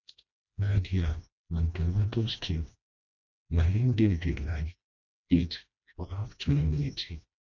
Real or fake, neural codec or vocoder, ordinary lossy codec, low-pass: fake; codec, 16 kHz, 1 kbps, FreqCodec, smaller model; none; 7.2 kHz